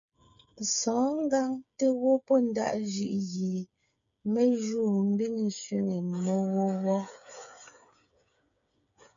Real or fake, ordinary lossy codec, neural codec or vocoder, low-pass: fake; MP3, 64 kbps; codec, 16 kHz, 8 kbps, FreqCodec, smaller model; 7.2 kHz